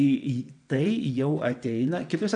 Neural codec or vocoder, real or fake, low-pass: vocoder, 22.05 kHz, 80 mel bands, WaveNeXt; fake; 9.9 kHz